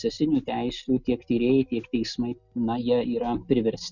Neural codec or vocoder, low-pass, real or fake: none; 7.2 kHz; real